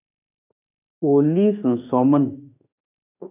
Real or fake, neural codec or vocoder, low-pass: fake; autoencoder, 48 kHz, 32 numbers a frame, DAC-VAE, trained on Japanese speech; 3.6 kHz